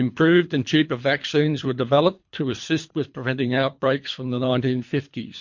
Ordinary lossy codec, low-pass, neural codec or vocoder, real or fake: MP3, 48 kbps; 7.2 kHz; codec, 24 kHz, 3 kbps, HILCodec; fake